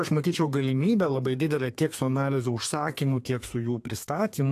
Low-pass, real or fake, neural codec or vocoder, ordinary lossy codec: 14.4 kHz; fake; codec, 32 kHz, 1.9 kbps, SNAC; AAC, 64 kbps